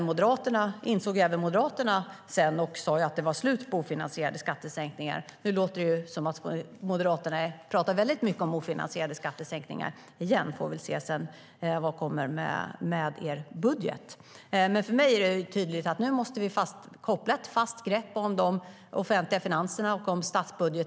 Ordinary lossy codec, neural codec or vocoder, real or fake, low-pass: none; none; real; none